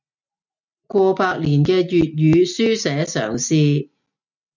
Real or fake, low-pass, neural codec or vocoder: real; 7.2 kHz; none